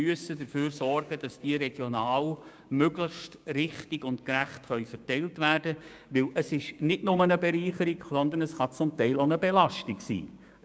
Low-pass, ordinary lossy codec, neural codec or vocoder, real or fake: none; none; codec, 16 kHz, 6 kbps, DAC; fake